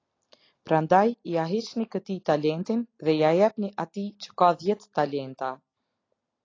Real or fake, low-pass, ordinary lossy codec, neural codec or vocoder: real; 7.2 kHz; AAC, 32 kbps; none